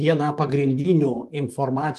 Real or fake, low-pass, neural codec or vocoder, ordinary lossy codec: fake; 14.4 kHz; vocoder, 44.1 kHz, 128 mel bands, Pupu-Vocoder; Opus, 24 kbps